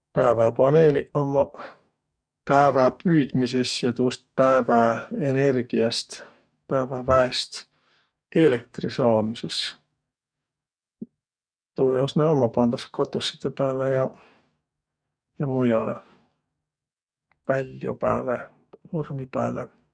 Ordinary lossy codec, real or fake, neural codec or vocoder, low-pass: none; fake; codec, 44.1 kHz, 2.6 kbps, DAC; 9.9 kHz